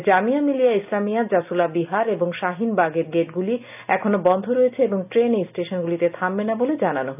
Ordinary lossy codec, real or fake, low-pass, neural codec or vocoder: none; real; 3.6 kHz; none